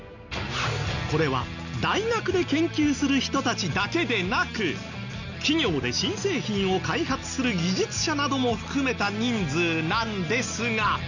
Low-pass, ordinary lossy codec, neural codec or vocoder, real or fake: 7.2 kHz; none; none; real